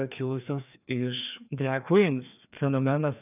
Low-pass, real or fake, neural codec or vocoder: 3.6 kHz; fake; codec, 16 kHz, 2 kbps, FreqCodec, larger model